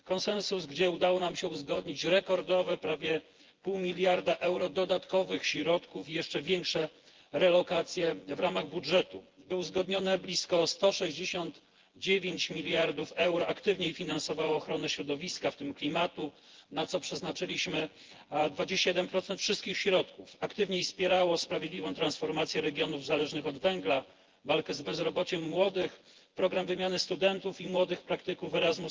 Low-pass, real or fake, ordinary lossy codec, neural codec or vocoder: 7.2 kHz; fake; Opus, 16 kbps; vocoder, 24 kHz, 100 mel bands, Vocos